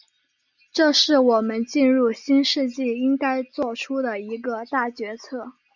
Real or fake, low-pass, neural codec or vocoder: real; 7.2 kHz; none